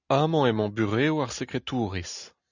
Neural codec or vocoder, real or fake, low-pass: none; real; 7.2 kHz